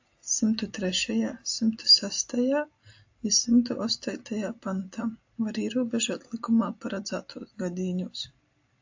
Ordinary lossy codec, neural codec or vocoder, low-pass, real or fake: AAC, 48 kbps; none; 7.2 kHz; real